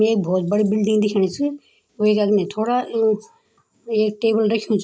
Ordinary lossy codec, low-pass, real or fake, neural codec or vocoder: none; none; real; none